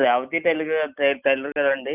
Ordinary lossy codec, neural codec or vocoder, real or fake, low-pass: none; none; real; 3.6 kHz